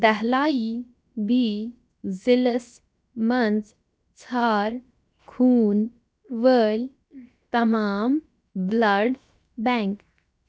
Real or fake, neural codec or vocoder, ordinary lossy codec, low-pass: fake; codec, 16 kHz, 0.7 kbps, FocalCodec; none; none